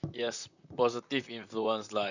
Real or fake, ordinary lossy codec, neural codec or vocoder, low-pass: fake; none; vocoder, 44.1 kHz, 128 mel bands, Pupu-Vocoder; 7.2 kHz